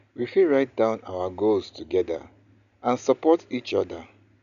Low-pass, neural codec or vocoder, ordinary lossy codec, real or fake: 7.2 kHz; none; none; real